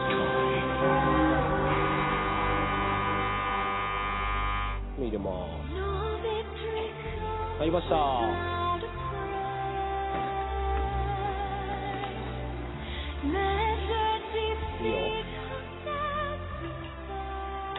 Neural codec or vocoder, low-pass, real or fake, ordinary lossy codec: none; 7.2 kHz; real; AAC, 16 kbps